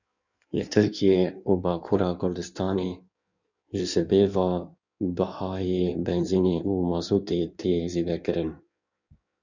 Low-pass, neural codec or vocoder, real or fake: 7.2 kHz; codec, 16 kHz in and 24 kHz out, 1.1 kbps, FireRedTTS-2 codec; fake